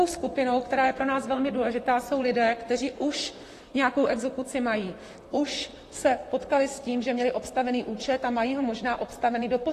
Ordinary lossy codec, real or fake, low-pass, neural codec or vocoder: AAC, 48 kbps; fake; 14.4 kHz; vocoder, 44.1 kHz, 128 mel bands, Pupu-Vocoder